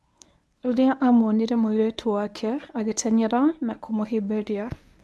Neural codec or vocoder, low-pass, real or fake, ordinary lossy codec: codec, 24 kHz, 0.9 kbps, WavTokenizer, medium speech release version 1; none; fake; none